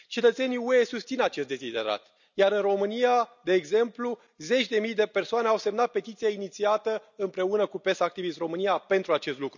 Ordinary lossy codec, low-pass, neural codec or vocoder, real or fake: none; 7.2 kHz; none; real